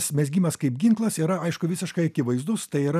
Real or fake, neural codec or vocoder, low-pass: real; none; 14.4 kHz